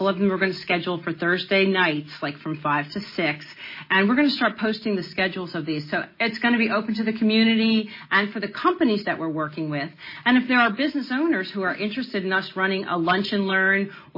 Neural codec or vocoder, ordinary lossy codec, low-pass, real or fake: none; MP3, 24 kbps; 5.4 kHz; real